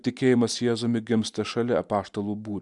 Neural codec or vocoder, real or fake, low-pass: none; real; 10.8 kHz